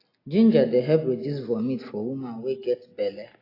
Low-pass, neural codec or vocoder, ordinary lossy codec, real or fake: 5.4 kHz; none; AAC, 24 kbps; real